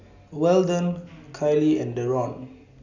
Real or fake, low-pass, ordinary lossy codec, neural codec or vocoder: real; 7.2 kHz; none; none